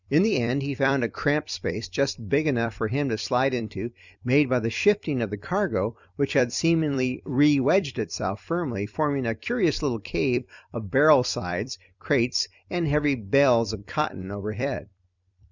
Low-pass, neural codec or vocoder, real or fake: 7.2 kHz; vocoder, 44.1 kHz, 128 mel bands every 256 samples, BigVGAN v2; fake